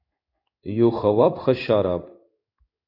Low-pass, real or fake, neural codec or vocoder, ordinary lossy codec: 5.4 kHz; fake; codec, 16 kHz in and 24 kHz out, 1 kbps, XY-Tokenizer; AAC, 32 kbps